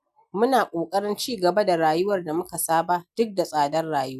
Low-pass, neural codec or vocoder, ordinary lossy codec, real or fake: 14.4 kHz; none; none; real